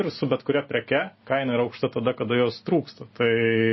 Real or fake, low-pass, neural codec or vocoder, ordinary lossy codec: real; 7.2 kHz; none; MP3, 24 kbps